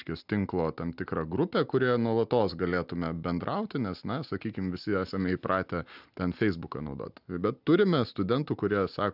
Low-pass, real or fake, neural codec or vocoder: 5.4 kHz; real; none